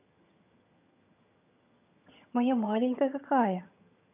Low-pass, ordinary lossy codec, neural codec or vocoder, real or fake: 3.6 kHz; none; vocoder, 22.05 kHz, 80 mel bands, HiFi-GAN; fake